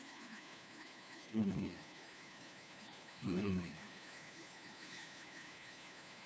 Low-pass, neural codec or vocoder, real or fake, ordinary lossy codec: none; codec, 16 kHz, 1 kbps, FunCodec, trained on LibriTTS, 50 frames a second; fake; none